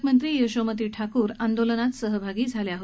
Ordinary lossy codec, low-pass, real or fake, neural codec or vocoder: none; none; real; none